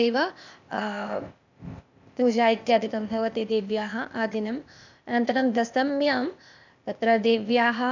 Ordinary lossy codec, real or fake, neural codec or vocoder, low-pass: none; fake; codec, 16 kHz, 0.8 kbps, ZipCodec; 7.2 kHz